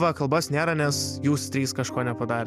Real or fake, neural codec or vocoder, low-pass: real; none; 14.4 kHz